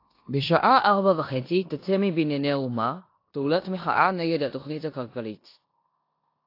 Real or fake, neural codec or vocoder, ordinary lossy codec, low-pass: fake; codec, 16 kHz in and 24 kHz out, 0.9 kbps, LongCat-Audio-Codec, four codebook decoder; AAC, 32 kbps; 5.4 kHz